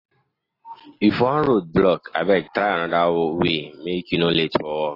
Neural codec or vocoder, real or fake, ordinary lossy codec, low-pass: none; real; AAC, 24 kbps; 5.4 kHz